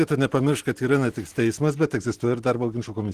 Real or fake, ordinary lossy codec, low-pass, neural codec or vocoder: real; Opus, 16 kbps; 14.4 kHz; none